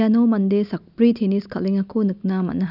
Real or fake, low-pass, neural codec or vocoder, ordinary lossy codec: real; 5.4 kHz; none; none